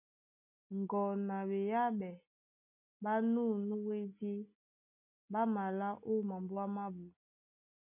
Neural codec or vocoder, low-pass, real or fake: none; 3.6 kHz; real